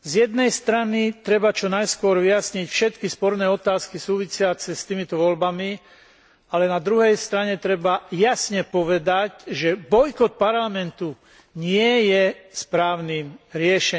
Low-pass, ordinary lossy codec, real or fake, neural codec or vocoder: none; none; real; none